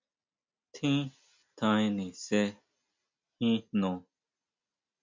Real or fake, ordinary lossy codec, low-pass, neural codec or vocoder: real; MP3, 64 kbps; 7.2 kHz; none